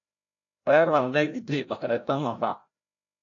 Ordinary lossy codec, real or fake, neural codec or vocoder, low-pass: AAC, 48 kbps; fake; codec, 16 kHz, 0.5 kbps, FreqCodec, larger model; 7.2 kHz